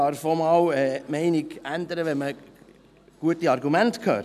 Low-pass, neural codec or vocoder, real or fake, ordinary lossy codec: 14.4 kHz; none; real; none